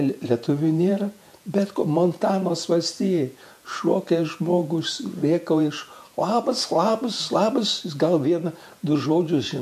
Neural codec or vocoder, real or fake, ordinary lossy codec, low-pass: vocoder, 48 kHz, 128 mel bands, Vocos; fake; MP3, 64 kbps; 14.4 kHz